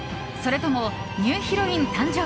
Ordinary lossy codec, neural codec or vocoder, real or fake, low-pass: none; none; real; none